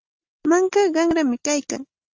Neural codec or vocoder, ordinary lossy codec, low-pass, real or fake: none; Opus, 24 kbps; 7.2 kHz; real